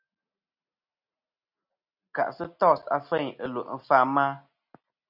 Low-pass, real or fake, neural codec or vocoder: 5.4 kHz; real; none